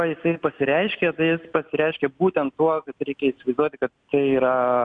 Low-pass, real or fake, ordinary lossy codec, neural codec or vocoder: 9.9 kHz; real; MP3, 96 kbps; none